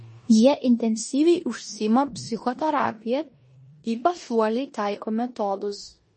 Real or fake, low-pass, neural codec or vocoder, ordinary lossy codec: fake; 10.8 kHz; codec, 16 kHz in and 24 kHz out, 0.9 kbps, LongCat-Audio-Codec, four codebook decoder; MP3, 32 kbps